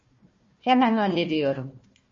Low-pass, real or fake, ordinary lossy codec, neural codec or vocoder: 7.2 kHz; fake; MP3, 32 kbps; codec, 16 kHz, 1 kbps, FunCodec, trained on Chinese and English, 50 frames a second